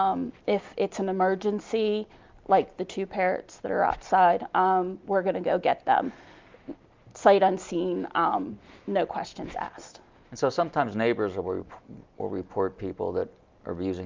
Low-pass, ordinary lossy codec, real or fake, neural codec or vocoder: 7.2 kHz; Opus, 24 kbps; real; none